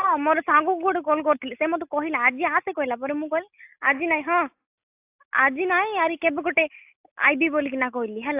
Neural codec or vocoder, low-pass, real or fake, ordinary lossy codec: none; 3.6 kHz; real; AAC, 32 kbps